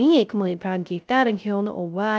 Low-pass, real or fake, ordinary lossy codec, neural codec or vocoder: none; fake; none; codec, 16 kHz, 0.3 kbps, FocalCodec